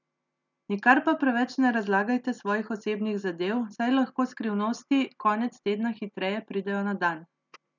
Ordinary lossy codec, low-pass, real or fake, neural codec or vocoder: none; 7.2 kHz; real; none